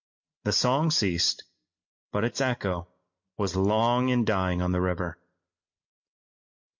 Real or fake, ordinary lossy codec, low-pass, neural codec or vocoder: real; MP3, 48 kbps; 7.2 kHz; none